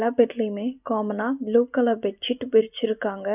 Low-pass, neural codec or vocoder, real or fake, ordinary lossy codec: 3.6 kHz; none; real; none